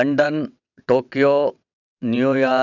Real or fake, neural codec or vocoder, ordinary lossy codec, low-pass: fake; vocoder, 22.05 kHz, 80 mel bands, WaveNeXt; none; 7.2 kHz